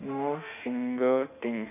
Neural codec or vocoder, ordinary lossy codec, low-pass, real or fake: autoencoder, 48 kHz, 32 numbers a frame, DAC-VAE, trained on Japanese speech; none; 3.6 kHz; fake